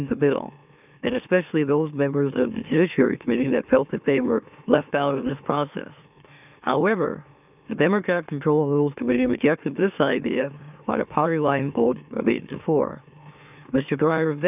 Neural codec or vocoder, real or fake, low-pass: autoencoder, 44.1 kHz, a latent of 192 numbers a frame, MeloTTS; fake; 3.6 kHz